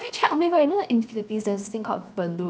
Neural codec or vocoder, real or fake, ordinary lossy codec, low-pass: codec, 16 kHz, 0.7 kbps, FocalCodec; fake; none; none